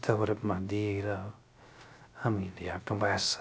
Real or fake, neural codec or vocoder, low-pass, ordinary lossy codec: fake; codec, 16 kHz, 0.3 kbps, FocalCodec; none; none